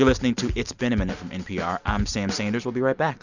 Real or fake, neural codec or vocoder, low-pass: real; none; 7.2 kHz